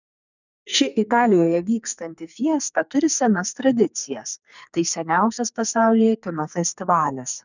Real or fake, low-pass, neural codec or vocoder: fake; 7.2 kHz; codec, 44.1 kHz, 2.6 kbps, SNAC